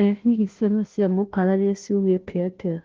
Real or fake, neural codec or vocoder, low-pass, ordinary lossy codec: fake; codec, 16 kHz, 0.5 kbps, FunCodec, trained on Chinese and English, 25 frames a second; 7.2 kHz; Opus, 16 kbps